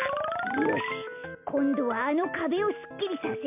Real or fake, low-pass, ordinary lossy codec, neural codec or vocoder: real; 3.6 kHz; MP3, 32 kbps; none